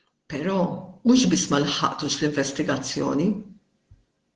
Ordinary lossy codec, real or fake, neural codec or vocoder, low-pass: Opus, 16 kbps; real; none; 10.8 kHz